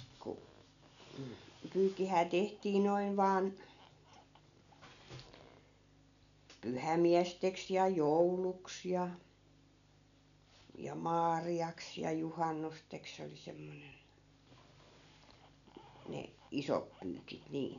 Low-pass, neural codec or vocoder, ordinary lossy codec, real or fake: 7.2 kHz; none; none; real